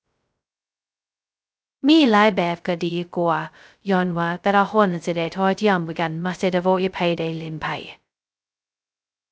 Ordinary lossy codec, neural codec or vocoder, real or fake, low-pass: none; codec, 16 kHz, 0.2 kbps, FocalCodec; fake; none